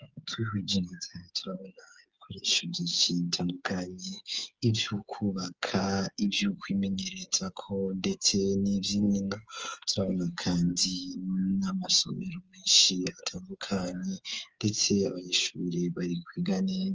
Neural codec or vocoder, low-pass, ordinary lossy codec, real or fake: codec, 16 kHz, 8 kbps, FreqCodec, smaller model; 7.2 kHz; Opus, 24 kbps; fake